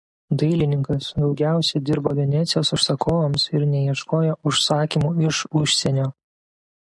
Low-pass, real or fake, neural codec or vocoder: 10.8 kHz; real; none